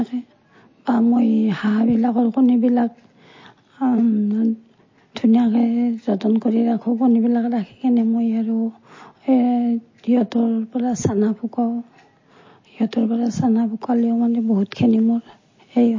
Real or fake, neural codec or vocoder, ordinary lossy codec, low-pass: real; none; MP3, 32 kbps; 7.2 kHz